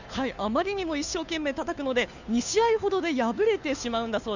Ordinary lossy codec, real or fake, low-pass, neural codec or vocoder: none; fake; 7.2 kHz; codec, 16 kHz, 2 kbps, FunCodec, trained on Chinese and English, 25 frames a second